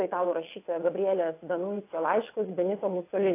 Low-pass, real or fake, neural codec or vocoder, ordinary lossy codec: 3.6 kHz; fake; vocoder, 22.05 kHz, 80 mel bands, WaveNeXt; AAC, 24 kbps